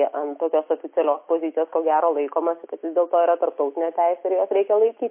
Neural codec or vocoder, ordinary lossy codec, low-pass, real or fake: none; MP3, 24 kbps; 3.6 kHz; real